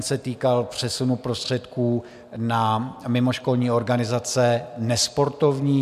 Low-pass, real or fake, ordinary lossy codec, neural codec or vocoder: 14.4 kHz; real; MP3, 96 kbps; none